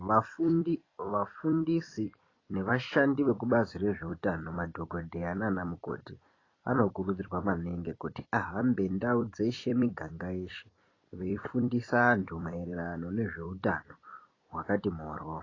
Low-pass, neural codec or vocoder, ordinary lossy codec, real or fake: 7.2 kHz; vocoder, 44.1 kHz, 128 mel bands every 256 samples, BigVGAN v2; AAC, 32 kbps; fake